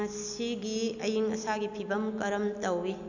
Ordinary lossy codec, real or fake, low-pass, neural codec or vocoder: none; real; 7.2 kHz; none